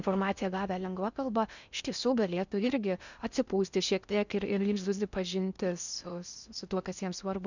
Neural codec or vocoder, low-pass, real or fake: codec, 16 kHz in and 24 kHz out, 0.6 kbps, FocalCodec, streaming, 4096 codes; 7.2 kHz; fake